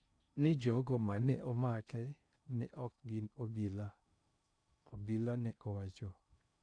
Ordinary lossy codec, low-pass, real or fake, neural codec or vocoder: none; 9.9 kHz; fake; codec, 16 kHz in and 24 kHz out, 0.6 kbps, FocalCodec, streaming, 2048 codes